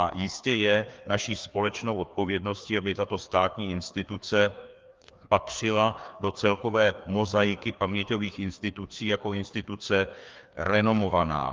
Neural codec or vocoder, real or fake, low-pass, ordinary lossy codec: codec, 16 kHz, 2 kbps, FreqCodec, larger model; fake; 7.2 kHz; Opus, 32 kbps